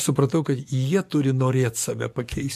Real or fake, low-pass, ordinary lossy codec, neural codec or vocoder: fake; 14.4 kHz; MP3, 64 kbps; codec, 44.1 kHz, 7.8 kbps, DAC